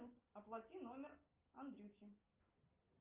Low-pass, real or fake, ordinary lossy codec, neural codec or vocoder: 3.6 kHz; real; Opus, 24 kbps; none